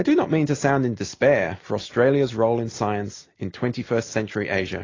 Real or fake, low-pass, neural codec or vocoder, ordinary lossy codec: real; 7.2 kHz; none; AAC, 32 kbps